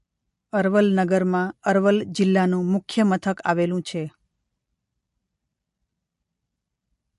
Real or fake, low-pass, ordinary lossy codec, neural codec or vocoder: real; 14.4 kHz; MP3, 48 kbps; none